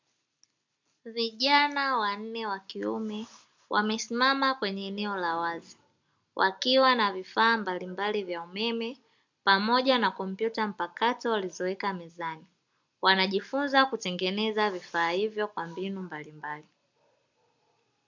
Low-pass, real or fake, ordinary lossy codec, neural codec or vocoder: 7.2 kHz; real; MP3, 64 kbps; none